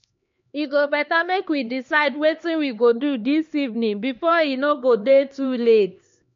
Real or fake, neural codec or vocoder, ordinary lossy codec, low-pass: fake; codec, 16 kHz, 2 kbps, X-Codec, HuBERT features, trained on LibriSpeech; MP3, 48 kbps; 7.2 kHz